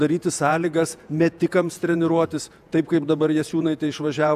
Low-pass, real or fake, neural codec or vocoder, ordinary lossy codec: 14.4 kHz; fake; vocoder, 48 kHz, 128 mel bands, Vocos; AAC, 96 kbps